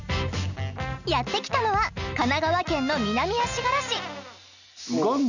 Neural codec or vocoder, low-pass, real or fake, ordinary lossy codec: none; 7.2 kHz; real; none